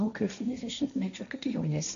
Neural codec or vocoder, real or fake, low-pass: codec, 16 kHz, 1.1 kbps, Voila-Tokenizer; fake; 7.2 kHz